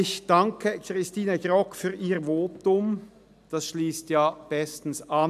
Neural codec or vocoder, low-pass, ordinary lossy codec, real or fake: none; 14.4 kHz; none; real